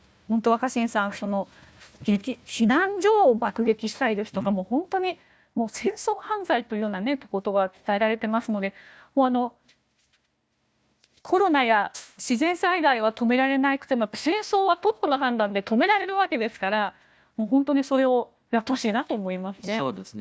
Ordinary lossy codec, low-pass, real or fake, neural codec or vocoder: none; none; fake; codec, 16 kHz, 1 kbps, FunCodec, trained on Chinese and English, 50 frames a second